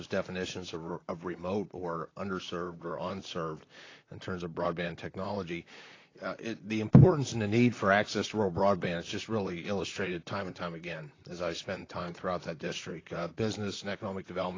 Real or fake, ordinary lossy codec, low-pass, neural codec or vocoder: fake; AAC, 32 kbps; 7.2 kHz; vocoder, 44.1 kHz, 128 mel bands, Pupu-Vocoder